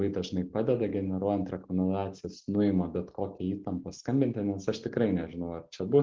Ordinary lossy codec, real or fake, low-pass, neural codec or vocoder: Opus, 16 kbps; real; 7.2 kHz; none